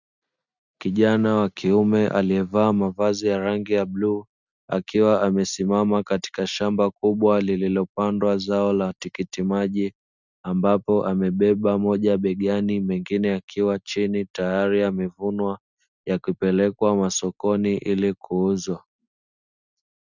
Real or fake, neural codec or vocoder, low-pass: real; none; 7.2 kHz